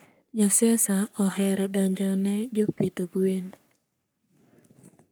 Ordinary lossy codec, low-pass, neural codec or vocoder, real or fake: none; none; codec, 44.1 kHz, 3.4 kbps, Pupu-Codec; fake